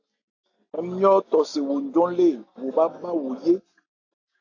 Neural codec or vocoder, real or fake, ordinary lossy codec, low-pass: none; real; AAC, 48 kbps; 7.2 kHz